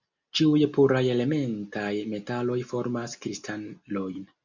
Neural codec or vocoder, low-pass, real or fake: none; 7.2 kHz; real